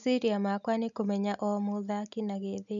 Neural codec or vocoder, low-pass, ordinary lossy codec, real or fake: none; 7.2 kHz; none; real